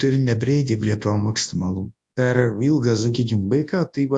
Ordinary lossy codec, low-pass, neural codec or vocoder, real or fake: AAC, 64 kbps; 10.8 kHz; codec, 24 kHz, 0.9 kbps, WavTokenizer, large speech release; fake